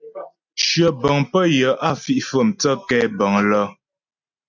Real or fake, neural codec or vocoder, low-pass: real; none; 7.2 kHz